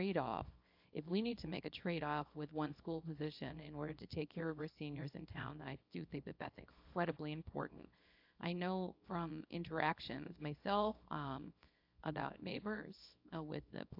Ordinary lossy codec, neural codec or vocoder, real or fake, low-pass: AAC, 48 kbps; codec, 24 kHz, 0.9 kbps, WavTokenizer, small release; fake; 5.4 kHz